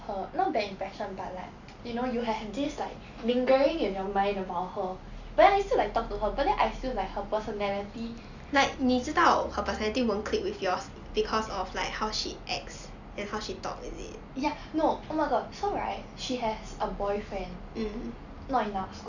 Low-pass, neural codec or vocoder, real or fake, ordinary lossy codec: 7.2 kHz; none; real; none